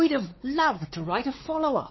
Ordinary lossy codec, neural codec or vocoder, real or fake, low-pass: MP3, 24 kbps; codec, 16 kHz, 4 kbps, X-Codec, WavLM features, trained on Multilingual LibriSpeech; fake; 7.2 kHz